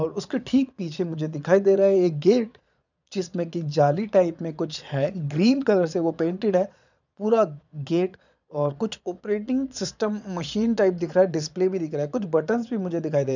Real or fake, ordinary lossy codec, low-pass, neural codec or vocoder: fake; none; 7.2 kHz; vocoder, 22.05 kHz, 80 mel bands, Vocos